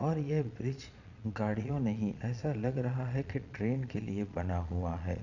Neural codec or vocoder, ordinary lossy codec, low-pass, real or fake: vocoder, 22.05 kHz, 80 mel bands, Vocos; none; 7.2 kHz; fake